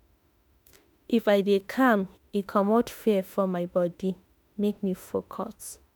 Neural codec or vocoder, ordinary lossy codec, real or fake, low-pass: autoencoder, 48 kHz, 32 numbers a frame, DAC-VAE, trained on Japanese speech; none; fake; none